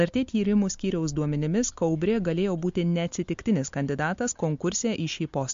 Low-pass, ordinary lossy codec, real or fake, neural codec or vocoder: 7.2 kHz; MP3, 48 kbps; real; none